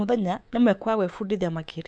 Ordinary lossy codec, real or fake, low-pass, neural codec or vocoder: none; fake; 9.9 kHz; codec, 24 kHz, 3.1 kbps, DualCodec